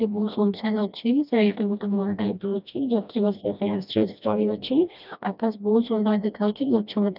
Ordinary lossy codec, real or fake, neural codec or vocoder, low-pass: none; fake; codec, 16 kHz, 1 kbps, FreqCodec, smaller model; 5.4 kHz